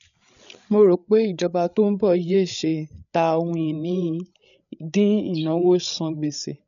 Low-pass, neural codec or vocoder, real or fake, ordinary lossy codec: 7.2 kHz; codec, 16 kHz, 8 kbps, FreqCodec, larger model; fake; none